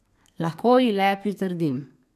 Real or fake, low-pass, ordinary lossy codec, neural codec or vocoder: fake; 14.4 kHz; none; codec, 32 kHz, 1.9 kbps, SNAC